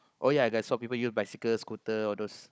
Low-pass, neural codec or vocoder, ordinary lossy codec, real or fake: none; codec, 16 kHz, 16 kbps, FunCodec, trained on Chinese and English, 50 frames a second; none; fake